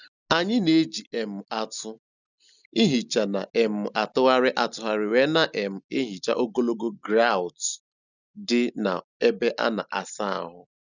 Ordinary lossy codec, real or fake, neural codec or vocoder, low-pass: none; real; none; 7.2 kHz